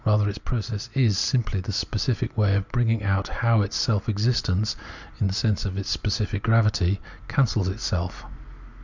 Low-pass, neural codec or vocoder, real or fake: 7.2 kHz; none; real